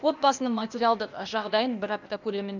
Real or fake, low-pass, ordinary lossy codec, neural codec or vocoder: fake; 7.2 kHz; none; codec, 16 kHz, 0.8 kbps, ZipCodec